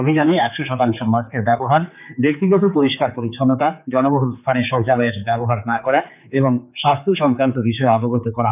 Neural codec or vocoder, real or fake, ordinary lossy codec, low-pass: codec, 16 kHz, 4 kbps, X-Codec, HuBERT features, trained on general audio; fake; none; 3.6 kHz